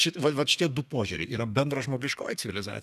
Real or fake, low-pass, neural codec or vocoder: fake; 14.4 kHz; codec, 32 kHz, 1.9 kbps, SNAC